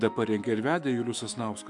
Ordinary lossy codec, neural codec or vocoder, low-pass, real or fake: MP3, 96 kbps; autoencoder, 48 kHz, 128 numbers a frame, DAC-VAE, trained on Japanese speech; 10.8 kHz; fake